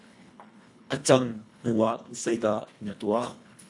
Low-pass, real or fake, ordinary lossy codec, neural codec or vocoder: 10.8 kHz; fake; AAC, 64 kbps; codec, 24 kHz, 1.5 kbps, HILCodec